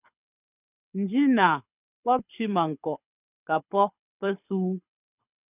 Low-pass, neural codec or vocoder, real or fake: 3.6 kHz; codec, 24 kHz, 6 kbps, HILCodec; fake